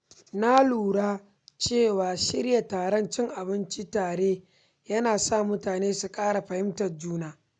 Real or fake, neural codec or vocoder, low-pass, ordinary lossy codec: real; none; 9.9 kHz; none